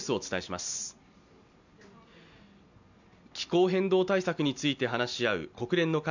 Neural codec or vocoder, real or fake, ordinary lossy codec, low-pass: none; real; none; 7.2 kHz